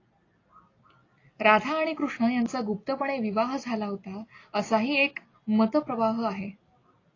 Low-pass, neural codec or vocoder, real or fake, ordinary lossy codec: 7.2 kHz; none; real; AAC, 32 kbps